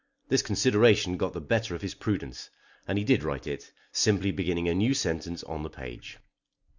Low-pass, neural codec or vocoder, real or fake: 7.2 kHz; none; real